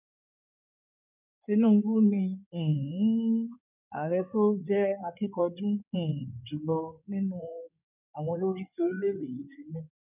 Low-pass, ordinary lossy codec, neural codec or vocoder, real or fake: 3.6 kHz; none; codec, 16 kHz in and 24 kHz out, 2.2 kbps, FireRedTTS-2 codec; fake